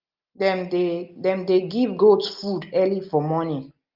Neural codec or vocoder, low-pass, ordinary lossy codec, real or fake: none; 5.4 kHz; Opus, 24 kbps; real